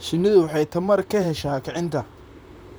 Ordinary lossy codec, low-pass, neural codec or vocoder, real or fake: none; none; vocoder, 44.1 kHz, 128 mel bands, Pupu-Vocoder; fake